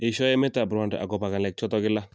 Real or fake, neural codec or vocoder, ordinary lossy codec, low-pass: real; none; none; none